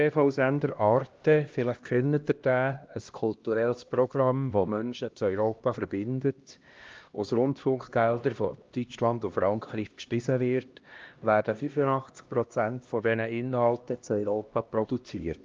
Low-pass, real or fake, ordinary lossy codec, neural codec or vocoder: 7.2 kHz; fake; Opus, 32 kbps; codec, 16 kHz, 1 kbps, X-Codec, HuBERT features, trained on LibriSpeech